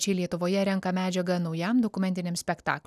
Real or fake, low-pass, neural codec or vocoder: real; 14.4 kHz; none